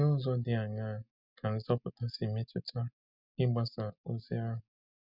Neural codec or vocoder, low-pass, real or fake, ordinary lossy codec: none; 5.4 kHz; real; none